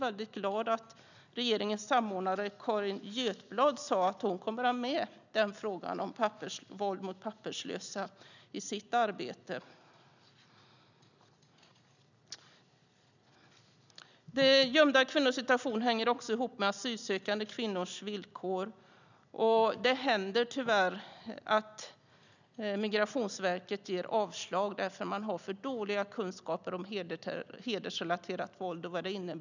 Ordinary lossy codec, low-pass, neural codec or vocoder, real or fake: none; 7.2 kHz; none; real